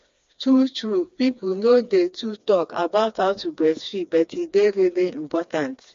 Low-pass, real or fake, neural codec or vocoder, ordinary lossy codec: 7.2 kHz; fake; codec, 16 kHz, 2 kbps, FreqCodec, smaller model; MP3, 48 kbps